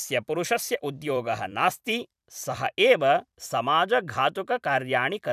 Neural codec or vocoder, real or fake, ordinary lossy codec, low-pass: vocoder, 44.1 kHz, 128 mel bands every 256 samples, BigVGAN v2; fake; none; 14.4 kHz